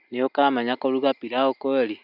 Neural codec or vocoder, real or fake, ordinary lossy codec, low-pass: none; real; none; 5.4 kHz